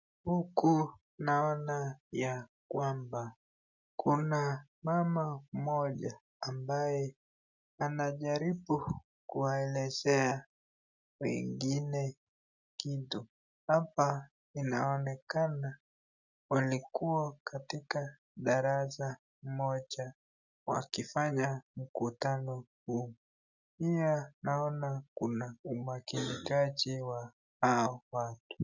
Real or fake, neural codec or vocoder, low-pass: real; none; 7.2 kHz